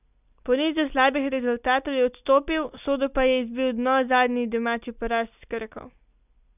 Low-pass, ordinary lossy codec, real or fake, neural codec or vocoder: 3.6 kHz; none; real; none